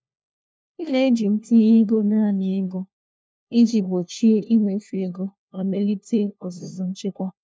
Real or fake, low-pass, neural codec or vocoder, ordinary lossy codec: fake; none; codec, 16 kHz, 1 kbps, FunCodec, trained on LibriTTS, 50 frames a second; none